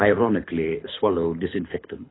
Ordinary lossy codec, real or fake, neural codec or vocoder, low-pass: AAC, 16 kbps; fake; codec, 24 kHz, 6 kbps, HILCodec; 7.2 kHz